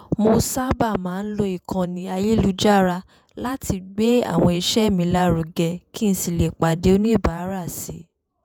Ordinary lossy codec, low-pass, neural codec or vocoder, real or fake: none; none; vocoder, 48 kHz, 128 mel bands, Vocos; fake